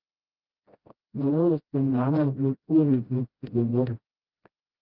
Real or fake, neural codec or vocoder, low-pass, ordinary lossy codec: fake; codec, 16 kHz, 0.5 kbps, FreqCodec, smaller model; 5.4 kHz; Opus, 32 kbps